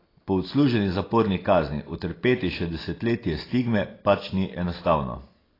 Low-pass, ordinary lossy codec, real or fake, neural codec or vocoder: 5.4 kHz; AAC, 24 kbps; real; none